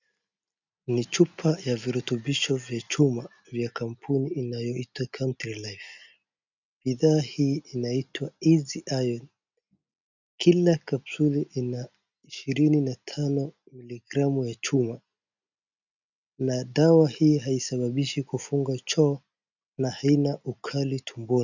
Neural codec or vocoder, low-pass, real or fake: none; 7.2 kHz; real